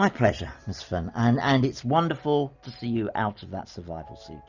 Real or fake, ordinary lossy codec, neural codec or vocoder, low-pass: real; Opus, 64 kbps; none; 7.2 kHz